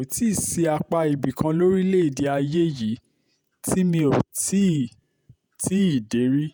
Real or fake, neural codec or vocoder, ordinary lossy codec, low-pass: fake; vocoder, 48 kHz, 128 mel bands, Vocos; none; none